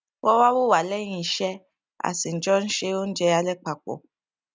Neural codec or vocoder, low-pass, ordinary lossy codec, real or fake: none; none; none; real